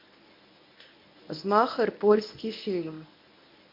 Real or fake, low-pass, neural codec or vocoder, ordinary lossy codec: fake; 5.4 kHz; codec, 24 kHz, 0.9 kbps, WavTokenizer, medium speech release version 1; MP3, 48 kbps